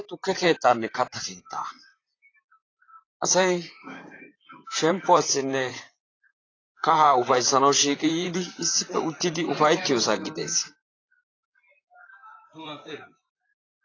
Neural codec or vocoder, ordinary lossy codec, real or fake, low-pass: vocoder, 44.1 kHz, 128 mel bands, Pupu-Vocoder; AAC, 32 kbps; fake; 7.2 kHz